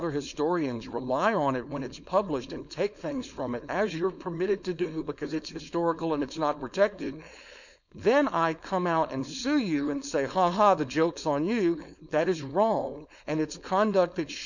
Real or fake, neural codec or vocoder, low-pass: fake; codec, 16 kHz, 4.8 kbps, FACodec; 7.2 kHz